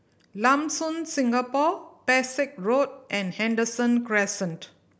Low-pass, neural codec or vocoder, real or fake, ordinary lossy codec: none; none; real; none